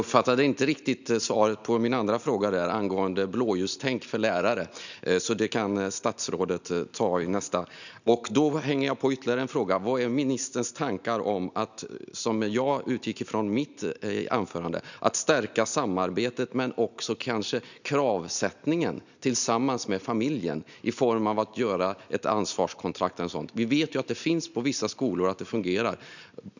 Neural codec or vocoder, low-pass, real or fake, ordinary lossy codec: none; 7.2 kHz; real; none